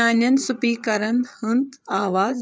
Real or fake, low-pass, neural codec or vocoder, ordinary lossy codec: fake; none; codec, 16 kHz, 8 kbps, FreqCodec, larger model; none